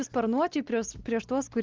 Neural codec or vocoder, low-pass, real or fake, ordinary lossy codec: none; 7.2 kHz; real; Opus, 16 kbps